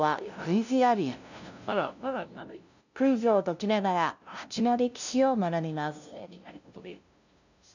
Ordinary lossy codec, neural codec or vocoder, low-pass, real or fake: none; codec, 16 kHz, 0.5 kbps, FunCodec, trained on LibriTTS, 25 frames a second; 7.2 kHz; fake